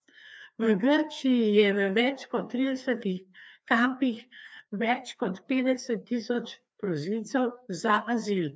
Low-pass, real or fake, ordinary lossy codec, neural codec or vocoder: none; fake; none; codec, 16 kHz, 2 kbps, FreqCodec, larger model